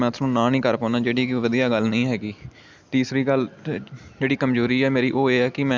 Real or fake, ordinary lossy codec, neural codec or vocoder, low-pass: real; none; none; none